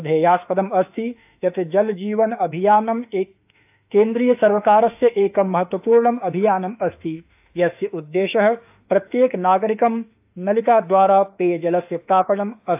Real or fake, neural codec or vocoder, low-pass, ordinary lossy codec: fake; autoencoder, 48 kHz, 32 numbers a frame, DAC-VAE, trained on Japanese speech; 3.6 kHz; AAC, 32 kbps